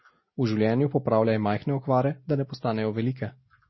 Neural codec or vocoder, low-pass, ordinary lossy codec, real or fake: none; 7.2 kHz; MP3, 24 kbps; real